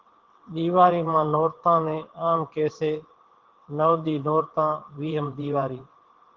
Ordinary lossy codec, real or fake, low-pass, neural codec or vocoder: Opus, 16 kbps; fake; 7.2 kHz; vocoder, 22.05 kHz, 80 mel bands, WaveNeXt